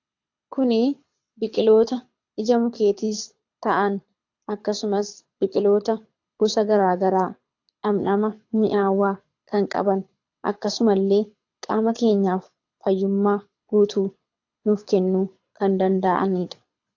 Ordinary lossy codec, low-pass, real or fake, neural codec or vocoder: AAC, 48 kbps; 7.2 kHz; fake; codec, 24 kHz, 6 kbps, HILCodec